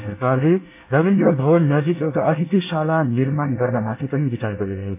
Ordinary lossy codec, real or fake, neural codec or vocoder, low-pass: MP3, 24 kbps; fake; codec, 24 kHz, 1 kbps, SNAC; 3.6 kHz